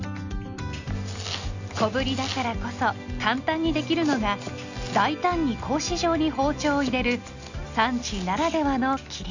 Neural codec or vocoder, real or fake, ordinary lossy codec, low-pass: none; real; none; 7.2 kHz